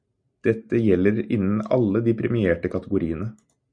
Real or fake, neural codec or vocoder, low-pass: real; none; 9.9 kHz